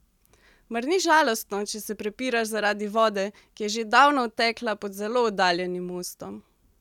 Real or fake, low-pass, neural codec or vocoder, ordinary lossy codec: real; 19.8 kHz; none; none